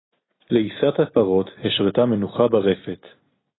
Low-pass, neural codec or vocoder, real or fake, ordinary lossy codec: 7.2 kHz; none; real; AAC, 16 kbps